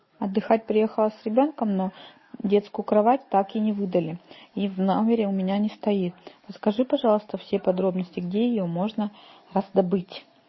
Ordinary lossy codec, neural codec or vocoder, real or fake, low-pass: MP3, 24 kbps; none; real; 7.2 kHz